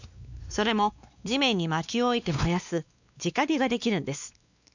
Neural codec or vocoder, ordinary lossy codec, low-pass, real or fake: codec, 16 kHz, 2 kbps, X-Codec, WavLM features, trained on Multilingual LibriSpeech; none; 7.2 kHz; fake